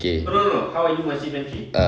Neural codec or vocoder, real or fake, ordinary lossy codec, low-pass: none; real; none; none